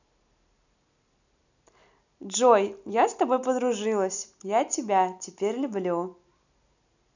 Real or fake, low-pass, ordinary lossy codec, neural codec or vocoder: real; 7.2 kHz; none; none